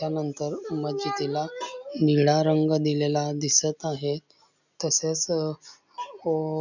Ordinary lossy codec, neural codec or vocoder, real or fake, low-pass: none; none; real; 7.2 kHz